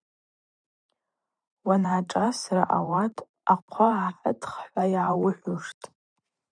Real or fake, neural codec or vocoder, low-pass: fake; vocoder, 44.1 kHz, 128 mel bands every 512 samples, BigVGAN v2; 9.9 kHz